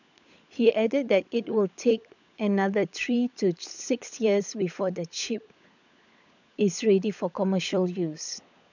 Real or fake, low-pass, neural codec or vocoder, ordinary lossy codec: fake; 7.2 kHz; codec, 16 kHz, 16 kbps, FunCodec, trained on LibriTTS, 50 frames a second; none